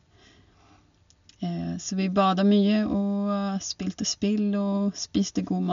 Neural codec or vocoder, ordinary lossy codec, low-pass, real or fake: none; MP3, 64 kbps; 7.2 kHz; real